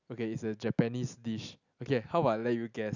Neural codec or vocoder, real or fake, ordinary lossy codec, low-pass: none; real; none; 7.2 kHz